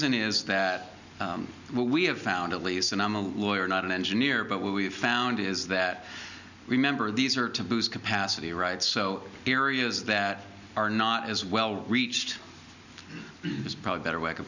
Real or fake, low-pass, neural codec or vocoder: real; 7.2 kHz; none